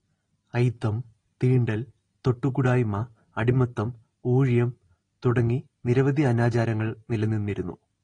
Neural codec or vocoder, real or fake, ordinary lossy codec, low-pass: none; real; AAC, 32 kbps; 9.9 kHz